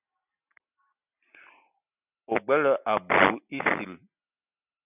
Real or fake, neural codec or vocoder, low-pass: real; none; 3.6 kHz